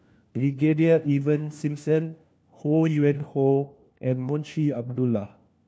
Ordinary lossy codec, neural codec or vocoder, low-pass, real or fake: none; codec, 16 kHz, 1 kbps, FunCodec, trained on LibriTTS, 50 frames a second; none; fake